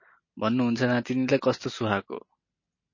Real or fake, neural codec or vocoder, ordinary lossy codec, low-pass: real; none; MP3, 32 kbps; 7.2 kHz